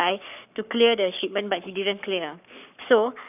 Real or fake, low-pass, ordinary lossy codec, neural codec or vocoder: fake; 3.6 kHz; none; codec, 44.1 kHz, 7.8 kbps, Pupu-Codec